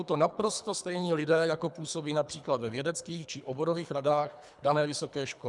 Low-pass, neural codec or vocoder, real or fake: 10.8 kHz; codec, 24 kHz, 3 kbps, HILCodec; fake